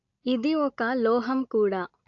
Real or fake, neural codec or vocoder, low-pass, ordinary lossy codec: real; none; 7.2 kHz; AAC, 64 kbps